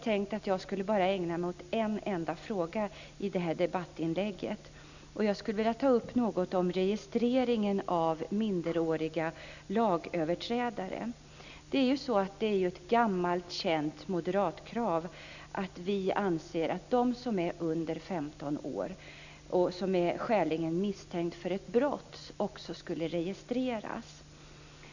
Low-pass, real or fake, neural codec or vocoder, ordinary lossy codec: 7.2 kHz; real; none; none